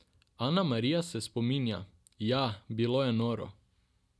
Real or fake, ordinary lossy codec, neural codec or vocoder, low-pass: real; none; none; none